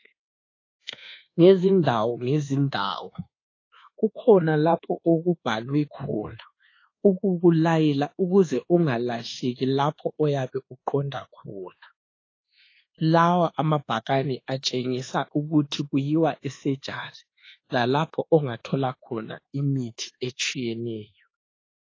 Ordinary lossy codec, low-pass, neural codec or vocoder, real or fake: AAC, 32 kbps; 7.2 kHz; codec, 24 kHz, 1.2 kbps, DualCodec; fake